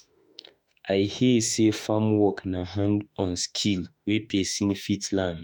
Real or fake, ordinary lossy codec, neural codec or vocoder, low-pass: fake; none; autoencoder, 48 kHz, 32 numbers a frame, DAC-VAE, trained on Japanese speech; none